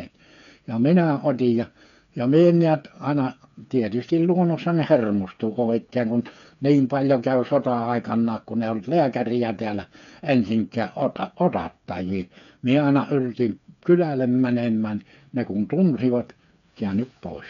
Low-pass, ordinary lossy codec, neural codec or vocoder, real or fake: 7.2 kHz; none; codec, 16 kHz, 8 kbps, FreqCodec, smaller model; fake